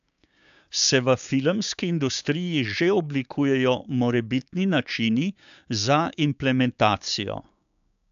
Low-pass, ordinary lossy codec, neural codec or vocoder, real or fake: 7.2 kHz; AAC, 96 kbps; codec, 16 kHz, 6 kbps, DAC; fake